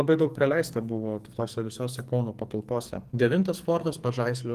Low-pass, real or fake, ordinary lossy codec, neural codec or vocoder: 14.4 kHz; fake; Opus, 24 kbps; codec, 44.1 kHz, 2.6 kbps, SNAC